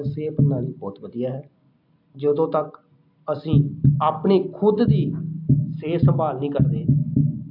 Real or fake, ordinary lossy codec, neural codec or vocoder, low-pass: real; AAC, 48 kbps; none; 5.4 kHz